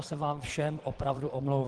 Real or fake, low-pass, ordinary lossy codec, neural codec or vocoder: fake; 9.9 kHz; Opus, 16 kbps; vocoder, 22.05 kHz, 80 mel bands, Vocos